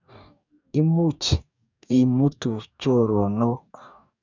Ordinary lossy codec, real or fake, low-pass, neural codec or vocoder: none; fake; 7.2 kHz; codec, 44.1 kHz, 2.6 kbps, DAC